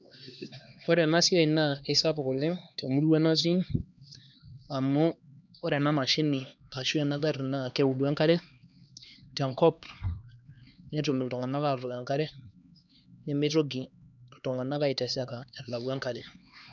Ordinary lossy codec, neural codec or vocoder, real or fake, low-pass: none; codec, 16 kHz, 2 kbps, X-Codec, HuBERT features, trained on LibriSpeech; fake; 7.2 kHz